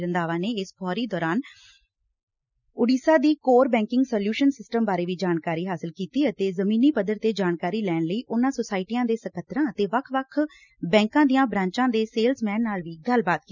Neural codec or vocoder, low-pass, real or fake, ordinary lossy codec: none; 7.2 kHz; real; none